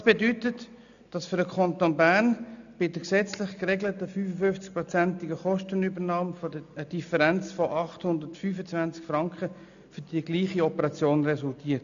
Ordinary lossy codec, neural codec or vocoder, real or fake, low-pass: none; none; real; 7.2 kHz